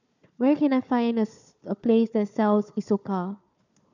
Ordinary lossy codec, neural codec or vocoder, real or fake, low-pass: none; codec, 16 kHz, 4 kbps, FunCodec, trained on Chinese and English, 50 frames a second; fake; 7.2 kHz